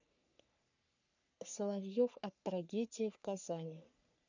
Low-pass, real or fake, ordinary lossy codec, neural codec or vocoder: 7.2 kHz; fake; none; codec, 44.1 kHz, 3.4 kbps, Pupu-Codec